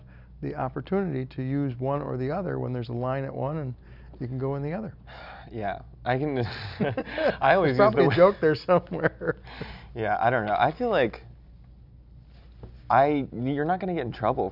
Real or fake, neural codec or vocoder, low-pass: real; none; 5.4 kHz